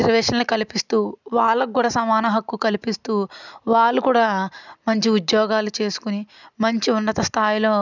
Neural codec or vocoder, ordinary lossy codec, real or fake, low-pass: none; none; real; 7.2 kHz